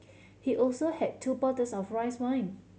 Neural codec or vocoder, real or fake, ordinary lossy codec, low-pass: none; real; none; none